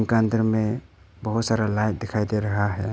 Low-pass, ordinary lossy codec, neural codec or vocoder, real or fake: none; none; none; real